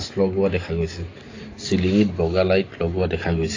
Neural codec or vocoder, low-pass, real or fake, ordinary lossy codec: none; 7.2 kHz; real; AAC, 32 kbps